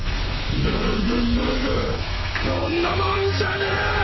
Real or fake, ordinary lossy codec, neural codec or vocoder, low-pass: fake; MP3, 24 kbps; codec, 32 kHz, 1.9 kbps, SNAC; 7.2 kHz